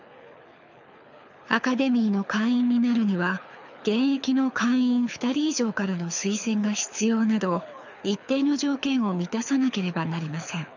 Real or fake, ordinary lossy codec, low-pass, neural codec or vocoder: fake; none; 7.2 kHz; codec, 24 kHz, 6 kbps, HILCodec